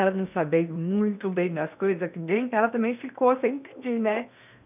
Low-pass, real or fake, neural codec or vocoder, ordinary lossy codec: 3.6 kHz; fake; codec, 16 kHz in and 24 kHz out, 0.8 kbps, FocalCodec, streaming, 65536 codes; none